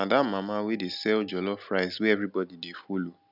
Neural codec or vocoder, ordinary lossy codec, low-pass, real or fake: none; none; 5.4 kHz; real